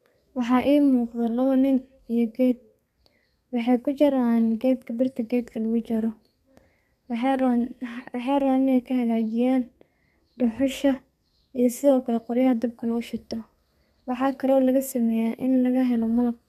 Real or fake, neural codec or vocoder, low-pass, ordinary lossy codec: fake; codec, 32 kHz, 1.9 kbps, SNAC; 14.4 kHz; none